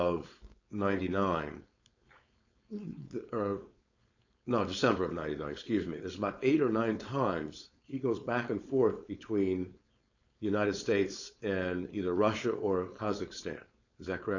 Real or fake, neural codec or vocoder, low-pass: fake; codec, 16 kHz, 4.8 kbps, FACodec; 7.2 kHz